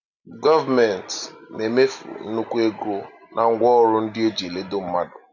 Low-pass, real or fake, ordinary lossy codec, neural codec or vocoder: 7.2 kHz; real; none; none